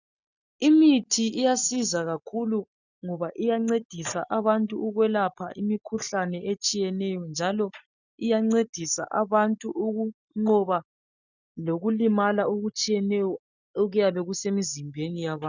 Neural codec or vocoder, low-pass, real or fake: none; 7.2 kHz; real